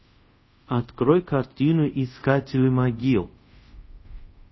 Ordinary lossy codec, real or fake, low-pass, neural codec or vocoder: MP3, 24 kbps; fake; 7.2 kHz; codec, 24 kHz, 0.5 kbps, DualCodec